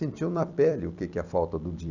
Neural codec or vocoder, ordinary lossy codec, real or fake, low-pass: vocoder, 44.1 kHz, 128 mel bands every 256 samples, BigVGAN v2; none; fake; 7.2 kHz